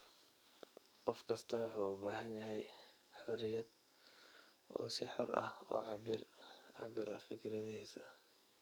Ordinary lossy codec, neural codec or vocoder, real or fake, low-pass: none; codec, 44.1 kHz, 2.6 kbps, SNAC; fake; none